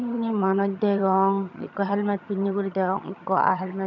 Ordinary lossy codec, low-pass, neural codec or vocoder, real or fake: none; 7.2 kHz; vocoder, 22.05 kHz, 80 mel bands, HiFi-GAN; fake